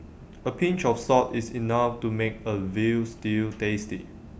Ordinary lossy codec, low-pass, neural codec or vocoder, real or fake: none; none; none; real